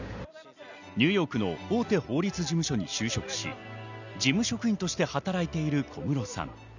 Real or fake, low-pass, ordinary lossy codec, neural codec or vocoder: real; 7.2 kHz; none; none